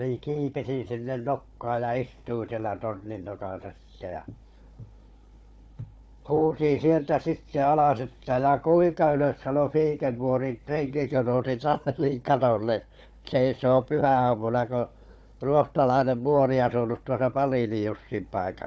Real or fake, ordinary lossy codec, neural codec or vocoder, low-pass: fake; none; codec, 16 kHz, 4 kbps, FunCodec, trained on Chinese and English, 50 frames a second; none